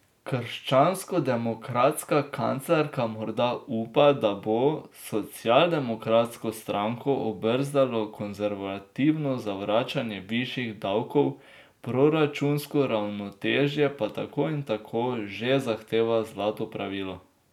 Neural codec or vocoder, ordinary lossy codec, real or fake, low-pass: none; none; real; 19.8 kHz